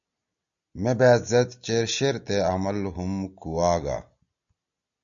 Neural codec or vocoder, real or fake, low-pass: none; real; 7.2 kHz